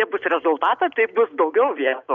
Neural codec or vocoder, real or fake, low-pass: none; real; 7.2 kHz